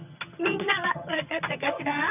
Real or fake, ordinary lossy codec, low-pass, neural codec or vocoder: fake; none; 3.6 kHz; vocoder, 22.05 kHz, 80 mel bands, HiFi-GAN